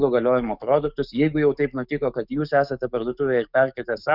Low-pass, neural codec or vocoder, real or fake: 5.4 kHz; codec, 44.1 kHz, 7.8 kbps, DAC; fake